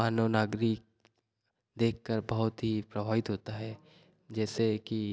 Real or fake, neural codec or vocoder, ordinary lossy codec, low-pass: real; none; none; none